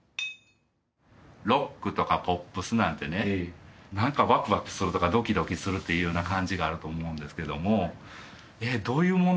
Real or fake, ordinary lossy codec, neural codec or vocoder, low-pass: real; none; none; none